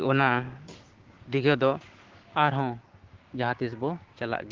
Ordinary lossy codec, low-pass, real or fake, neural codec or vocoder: Opus, 16 kbps; 7.2 kHz; fake; codec, 16 kHz, 6 kbps, DAC